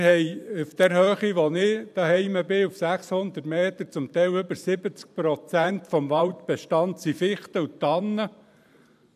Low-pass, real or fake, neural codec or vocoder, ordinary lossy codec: 14.4 kHz; fake; vocoder, 44.1 kHz, 128 mel bands every 512 samples, BigVGAN v2; none